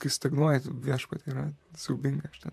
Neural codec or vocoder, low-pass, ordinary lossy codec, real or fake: vocoder, 44.1 kHz, 128 mel bands, Pupu-Vocoder; 14.4 kHz; MP3, 96 kbps; fake